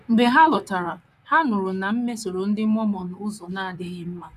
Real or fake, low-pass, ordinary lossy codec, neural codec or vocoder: fake; 14.4 kHz; none; vocoder, 44.1 kHz, 128 mel bands, Pupu-Vocoder